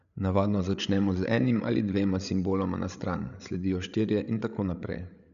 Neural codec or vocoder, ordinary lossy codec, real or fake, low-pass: codec, 16 kHz, 8 kbps, FreqCodec, larger model; MP3, 96 kbps; fake; 7.2 kHz